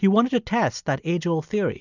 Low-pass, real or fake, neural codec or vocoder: 7.2 kHz; real; none